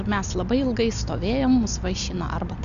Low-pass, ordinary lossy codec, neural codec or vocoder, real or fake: 7.2 kHz; AAC, 96 kbps; none; real